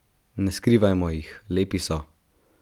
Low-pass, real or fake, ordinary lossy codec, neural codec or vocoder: 19.8 kHz; real; Opus, 32 kbps; none